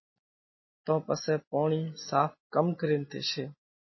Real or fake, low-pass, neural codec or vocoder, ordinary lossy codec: real; 7.2 kHz; none; MP3, 24 kbps